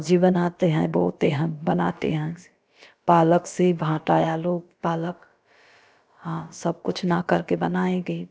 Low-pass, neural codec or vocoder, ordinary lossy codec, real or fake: none; codec, 16 kHz, about 1 kbps, DyCAST, with the encoder's durations; none; fake